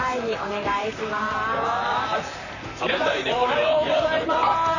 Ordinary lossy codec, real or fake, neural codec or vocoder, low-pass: none; fake; vocoder, 44.1 kHz, 128 mel bands, Pupu-Vocoder; 7.2 kHz